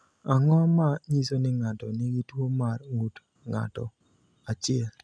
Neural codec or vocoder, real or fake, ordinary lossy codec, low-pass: none; real; none; none